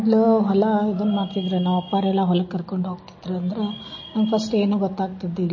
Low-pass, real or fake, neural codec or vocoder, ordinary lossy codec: 7.2 kHz; real; none; MP3, 32 kbps